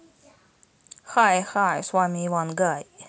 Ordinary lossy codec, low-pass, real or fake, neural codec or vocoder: none; none; real; none